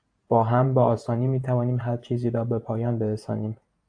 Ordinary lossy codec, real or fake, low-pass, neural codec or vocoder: AAC, 64 kbps; fake; 9.9 kHz; vocoder, 48 kHz, 128 mel bands, Vocos